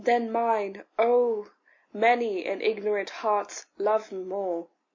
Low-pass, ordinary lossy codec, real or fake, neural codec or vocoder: 7.2 kHz; MP3, 48 kbps; real; none